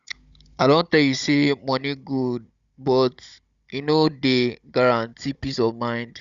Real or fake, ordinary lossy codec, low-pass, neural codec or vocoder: fake; Opus, 64 kbps; 7.2 kHz; codec, 16 kHz, 16 kbps, FunCodec, trained on Chinese and English, 50 frames a second